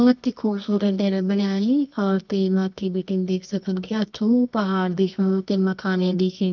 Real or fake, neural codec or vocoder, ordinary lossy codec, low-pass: fake; codec, 24 kHz, 0.9 kbps, WavTokenizer, medium music audio release; none; 7.2 kHz